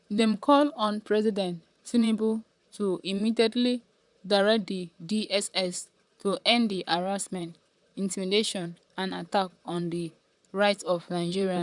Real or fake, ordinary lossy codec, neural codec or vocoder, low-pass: fake; none; vocoder, 44.1 kHz, 128 mel bands, Pupu-Vocoder; 10.8 kHz